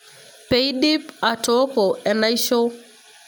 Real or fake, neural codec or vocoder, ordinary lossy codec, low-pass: real; none; none; none